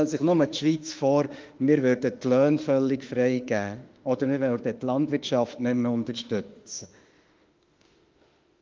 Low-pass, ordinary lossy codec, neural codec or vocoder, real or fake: 7.2 kHz; Opus, 32 kbps; autoencoder, 48 kHz, 32 numbers a frame, DAC-VAE, trained on Japanese speech; fake